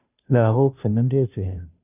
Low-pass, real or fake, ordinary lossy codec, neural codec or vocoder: 3.6 kHz; fake; none; codec, 16 kHz, 0.5 kbps, FunCodec, trained on Chinese and English, 25 frames a second